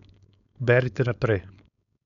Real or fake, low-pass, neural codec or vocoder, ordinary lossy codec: fake; 7.2 kHz; codec, 16 kHz, 4.8 kbps, FACodec; none